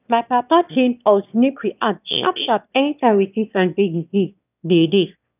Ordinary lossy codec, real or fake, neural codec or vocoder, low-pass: none; fake; autoencoder, 22.05 kHz, a latent of 192 numbers a frame, VITS, trained on one speaker; 3.6 kHz